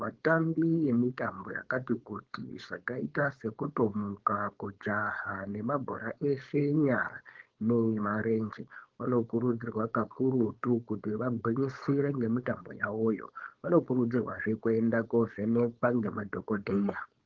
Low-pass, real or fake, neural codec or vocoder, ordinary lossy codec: 7.2 kHz; fake; codec, 16 kHz, 4.8 kbps, FACodec; Opus, 16 kbps